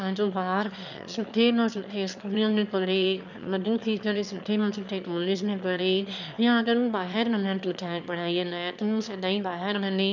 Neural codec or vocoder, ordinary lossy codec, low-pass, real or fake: autoencoder, 22.05 kHz, a latent of 192 numbers a frame, VITS, trained on one speaker; none; 7.2 kHz; fake